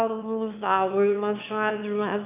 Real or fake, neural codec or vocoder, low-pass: fake; autoencoder, 22.05 kHz, a latent of 192 numbers a frame, VITS, trained on one speaker; 3.6 kHz